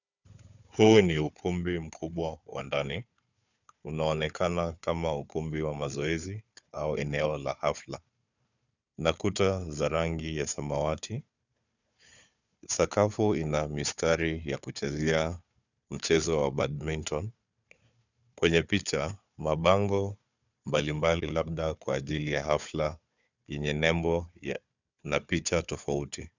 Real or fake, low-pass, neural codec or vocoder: fake; 7.2 kHz; codec, 16 kHz, 4 kbps, FunCodec, trained on Chinese and English, 50 frames a second